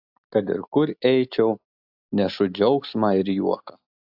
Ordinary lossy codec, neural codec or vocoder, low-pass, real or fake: AAC, 48 kbps; none; 5.4 kHz; real